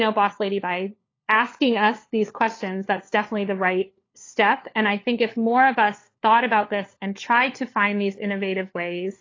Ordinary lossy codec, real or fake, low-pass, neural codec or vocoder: AAC, 32 kbps; fake; 7.2 kHz; codec, 16 kHz, 4 kbps, FunCodec, trained on LibriTTS, 50 frames a second